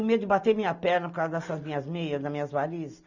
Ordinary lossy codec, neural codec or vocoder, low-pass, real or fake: none; none; 7.2 kHz; real